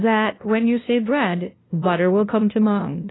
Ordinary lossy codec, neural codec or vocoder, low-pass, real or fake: AAC, 16 kbps; codec, 16 kHz, 0.5 kbps, FunCodec, trained on LibriTTS, 25 frames a second; 7.2 kHz; fake